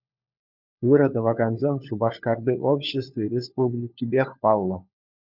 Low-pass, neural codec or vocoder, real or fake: 5.4 kHz; codec, 16 kHz, 4 kbps, FunCodec, trained on LibriTTS, 50 frames a second; fake